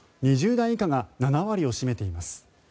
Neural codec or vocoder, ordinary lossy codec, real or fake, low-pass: none; none; real; none